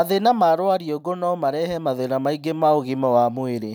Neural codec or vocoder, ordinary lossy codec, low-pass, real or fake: none; none; none; real